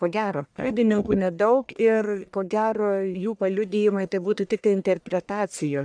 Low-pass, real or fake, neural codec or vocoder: 9.9 kHz; fake; codec, 44.1 kHz, 1.7 kbps, Pupu-Codec